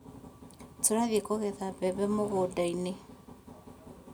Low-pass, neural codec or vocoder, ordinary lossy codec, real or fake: none; none; none; real